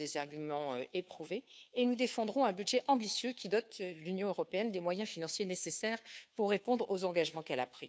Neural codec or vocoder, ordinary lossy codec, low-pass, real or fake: codec, 16 kHz, 2 kbps, FunCodec, trained on Chinese and English, 25 frames a second; none; none; fake